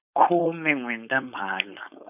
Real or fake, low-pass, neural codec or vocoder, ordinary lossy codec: fake; 3.6 kHz; codec, 16 kHz, 4.8 kbps, FACodec; none